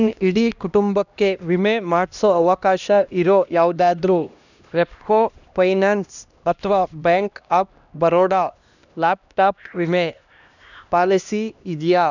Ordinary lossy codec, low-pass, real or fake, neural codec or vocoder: none; 7.2 kHz; fake; codec, 16 kHz, 1 kbps, X-Codec, HuBERT features, trained on LibriSpeech